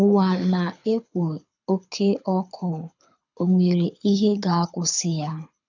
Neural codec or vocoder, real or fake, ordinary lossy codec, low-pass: codec, 24 kHz, 6 kbps, HILCodec; fake; none; 7.2 kHz